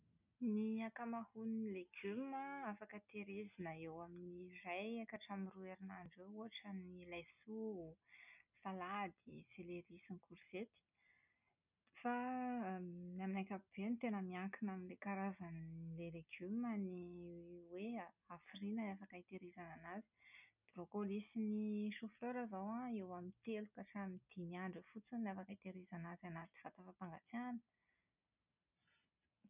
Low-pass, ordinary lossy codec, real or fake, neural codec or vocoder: 3.6 kHz; none; real; none